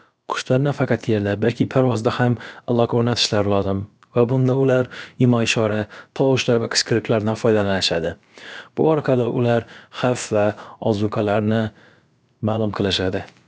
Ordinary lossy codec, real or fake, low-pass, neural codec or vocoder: none; fake; none; codec, 16 kHz, about 1 kbps, DyCAST, with the encoder's durations